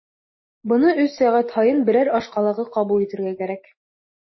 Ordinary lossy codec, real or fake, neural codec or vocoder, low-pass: MP3, 24 kbps; real; none; 7.2 kHz